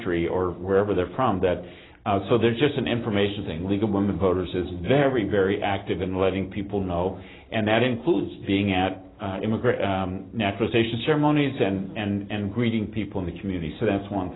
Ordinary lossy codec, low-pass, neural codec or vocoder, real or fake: AAC, 16 kbps; 7.2 kHz; none; real